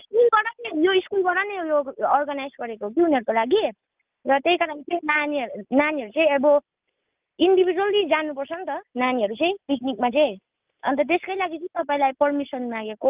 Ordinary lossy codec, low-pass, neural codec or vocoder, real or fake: Opus, 32 kbps; 3.6 kHz; none; real